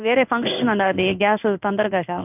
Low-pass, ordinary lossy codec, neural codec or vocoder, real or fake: 3.6 kHz; none; codec, 16 kHz in and 24 kHz out, 1 kbps, XY-Tokenizer; fake